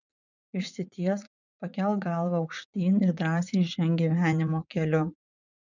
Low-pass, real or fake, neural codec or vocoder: 7.2 kHz; fake; vocoder, 44.1 kHz, 80 mel bands, Vocos